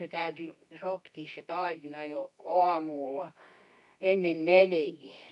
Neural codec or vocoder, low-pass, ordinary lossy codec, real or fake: codec, 24 kHz, 0.9 kbps, WavTokenizer, medium music audio release; 10.8 kHz; none; fake